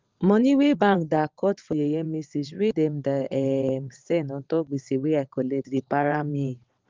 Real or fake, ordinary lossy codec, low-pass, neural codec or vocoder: fake; Opus, 32 kbps; 7.2 kHz; vocoder, 22.05 kHz, 80 mel bands, WaveNeXt